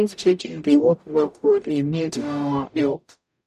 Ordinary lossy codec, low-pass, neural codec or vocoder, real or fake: none; 14.4 kHz; codec, 44.1 kHz, 0.9 kbps, DAC; fake